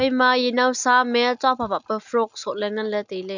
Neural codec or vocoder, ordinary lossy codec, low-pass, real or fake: none; none; 7.2 kHz; real